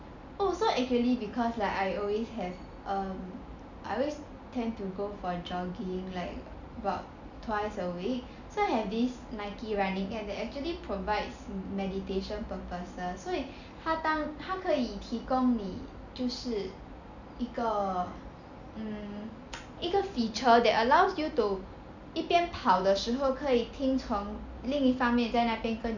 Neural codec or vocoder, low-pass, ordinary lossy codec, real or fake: none; 7.2 kHz; none; real